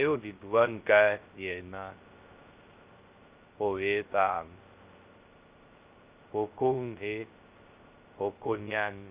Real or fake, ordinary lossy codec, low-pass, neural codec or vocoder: fake; Opus, 24 kbps; 3.6 kHz; codec, 16 kHz, 0.2 kbps, FocalCodec